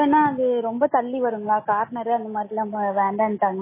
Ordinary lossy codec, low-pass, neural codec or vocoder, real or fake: MP3, 16 kbps; 3.6 kHz; none; real